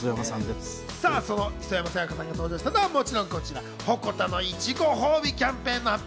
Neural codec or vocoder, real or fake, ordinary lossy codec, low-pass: none; real; none; none